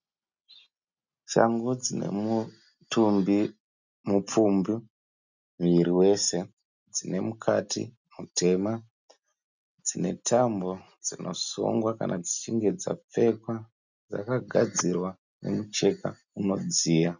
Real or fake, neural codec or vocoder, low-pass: real; none; 7.2 kHz